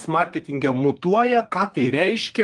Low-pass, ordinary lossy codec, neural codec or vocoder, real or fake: 10.8 kHz; Opus, 24 kbps; codec, 24 kHz, 1 kbps, SNAC; fake